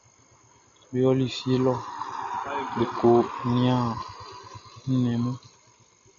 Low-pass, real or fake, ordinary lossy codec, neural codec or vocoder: 7.2 kHz; real; MP3, 64 kbps; none